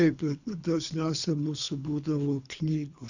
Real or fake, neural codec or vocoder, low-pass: fake; codec, 24 kHz, 3 kbps, HILCodec; 7.2 kHz